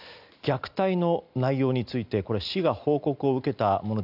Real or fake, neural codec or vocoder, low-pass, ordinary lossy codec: real; none; 5.4 kHz; none